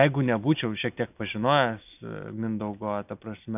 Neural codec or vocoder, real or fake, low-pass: none; real; 3.6 kHz